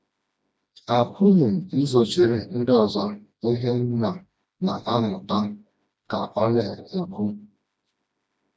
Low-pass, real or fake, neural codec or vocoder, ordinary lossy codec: none; fake; codec, 16 kHz, 1 kbps, FreqCodec, smaller model; none